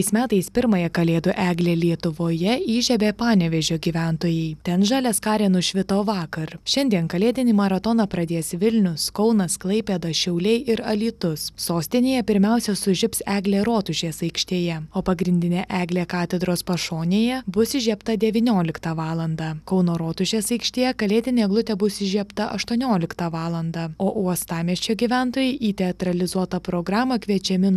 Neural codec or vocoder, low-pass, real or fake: none; 14.4 kHz; real